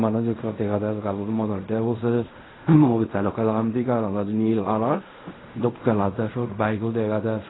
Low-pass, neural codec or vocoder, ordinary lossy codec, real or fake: 7.2 kHz; codec, 16 kHz in and 24 kHz out, 0.4 kbps, LongCat-Audio-Codec, fine tuned four codebook decoder; AAC, 16 kbps; fake